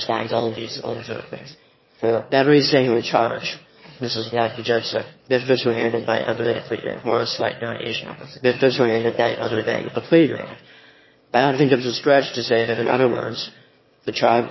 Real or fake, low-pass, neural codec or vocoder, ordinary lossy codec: fake; 7.2 kHz; autoencoder, 22.05 kHz, a latent of 192 numbers a frame, VITS, trained on one speaker; MP3, 24 kbps